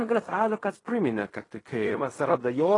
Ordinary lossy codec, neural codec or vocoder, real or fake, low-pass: AAC, 32 kbps; codec, 16 kHz in and 24 kHz out, 0.4 kbps, LongCat-Audio-Codec, fine tuned four codebook decoder; fake; 10.8 kHz